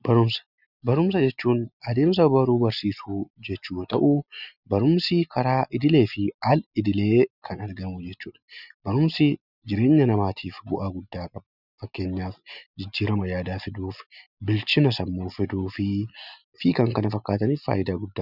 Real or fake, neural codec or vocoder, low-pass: real; none; 5.4 kHz